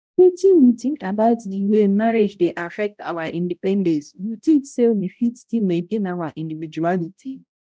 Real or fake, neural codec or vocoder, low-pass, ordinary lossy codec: fake; codec, 16 kHz, 0.5 kbps, X-Codec, HuBERT features, trained on balanced general audio; none; none